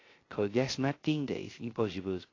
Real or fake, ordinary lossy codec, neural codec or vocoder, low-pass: fake; AAC, 32 kbps; codec, 16 kHz, 0.3 kbps, FocalCodec; 7.2 kHz